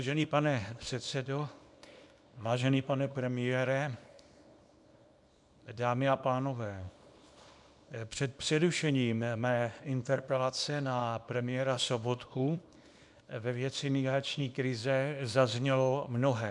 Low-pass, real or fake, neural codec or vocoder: 10.8 kHz; fake; codec, 24 kHz, 0.9 kbps, WavTokenizer, small release